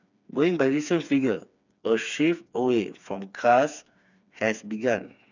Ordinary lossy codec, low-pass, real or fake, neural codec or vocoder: none; 7.2 kHz; fake; codec, 16 kHz, 4 kbps, FreqCodec, smaller model